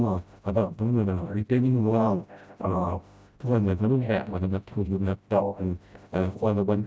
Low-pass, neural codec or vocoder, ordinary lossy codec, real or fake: none; codec, 16 kHz, 0.5 kbps, FreqCodec, smaller model; none; fake